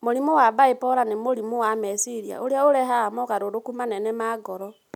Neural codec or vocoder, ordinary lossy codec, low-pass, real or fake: none; none; 19.8 kHz; real